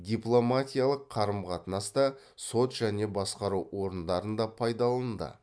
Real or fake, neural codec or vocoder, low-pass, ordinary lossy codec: real; none; none; none